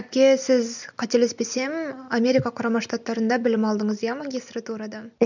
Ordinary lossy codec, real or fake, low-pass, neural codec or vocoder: none; real; 7.2 kHz; none